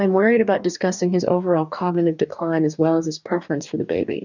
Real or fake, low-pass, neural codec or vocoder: fake; 7.2 kHz; codec, 44.1 kHz, 2.6 kbps, DAC